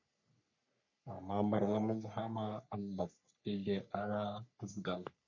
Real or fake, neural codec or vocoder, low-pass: fake; codec, 44.1 kHz, 3.4 kbps, Pupu-Codec; 7.2 kHz